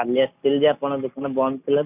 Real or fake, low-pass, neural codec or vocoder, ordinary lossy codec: real; 3.6 kHz; none; none